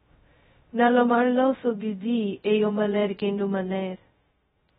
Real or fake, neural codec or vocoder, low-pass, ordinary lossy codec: fake; codec, 16 kHz, 0.2 kbps, FocalCodec; 7.2 kHz; AAC, 16 kbps